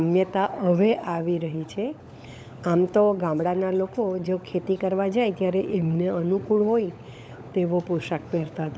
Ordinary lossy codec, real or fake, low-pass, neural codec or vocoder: none; fake; none; codec, 16 kHz, 16 kbps, FunCodec, trained on LibriTTS, 50 frames a second